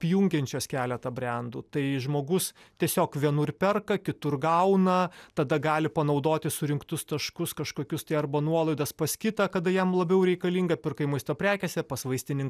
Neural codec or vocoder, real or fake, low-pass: none; real; 14.4 kHz